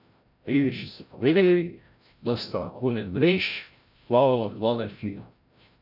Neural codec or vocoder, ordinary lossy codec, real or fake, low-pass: codec, 16 kHz, 0.5 kbps, FreqCodec, larger model; none; fake; 5.4 kHz